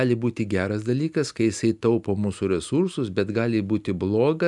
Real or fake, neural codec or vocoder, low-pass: real; none; 10.8 kHz